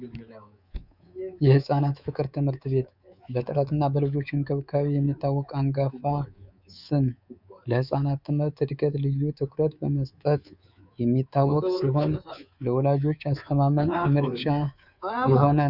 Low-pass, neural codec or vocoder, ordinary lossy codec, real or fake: 5.4 kHz; codec, 24 kHz, 3.1 kbps, DualCodec; Opus, 64 kbps; fake